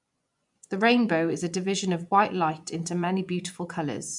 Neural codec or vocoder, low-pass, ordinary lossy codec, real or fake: vocoder, 24 kHz, 100 mel bands, Vocos; 10.8 kHz; none; fake